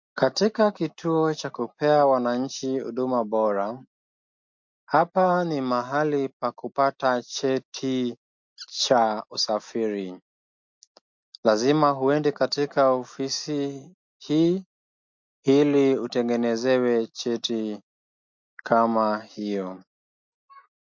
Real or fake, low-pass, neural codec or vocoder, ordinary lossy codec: real; 7.2 kHz; none; MP3, 48 kbps